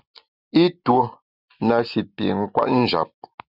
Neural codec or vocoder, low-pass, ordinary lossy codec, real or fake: none; 5.4 kHz; Opus, 64 kbps; real